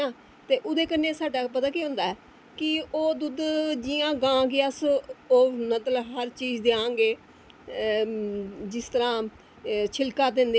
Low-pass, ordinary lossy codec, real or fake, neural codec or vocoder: none; none; real; none